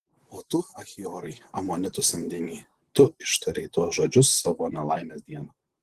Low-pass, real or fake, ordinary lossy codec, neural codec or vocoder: 14.4 kHz; fake; Opus, 16 kbps; vocoder, 44.1 kHz, 128 mel bands, Pupu-Vocoder